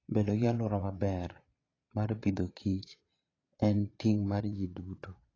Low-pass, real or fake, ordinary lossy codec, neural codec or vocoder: 7.2 kHz; fake; AAC, 32 kbps; vocoder, 22.05 kHz, 80 mel bands, Vocos